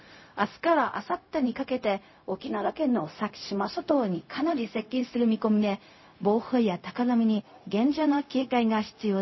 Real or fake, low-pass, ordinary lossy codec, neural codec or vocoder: fake; 7.2 kHz; MP3, 24 kbps; codec, 16 kHz, 0.4 kbps, LongCat-Audio-Codec